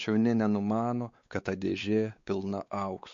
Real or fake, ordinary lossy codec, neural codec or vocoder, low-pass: fake; MP3, 48 kbps; codec, 16 kHz, 4 kbps, X-Codec, WavLM features, trained on Multilingual LibriSpeech; 7.2 kHz